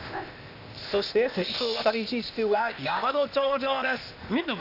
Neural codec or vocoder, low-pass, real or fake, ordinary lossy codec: codec, 16 kHz, 0.8 kbps, ZipCodec; 5.4 kHz; fake; none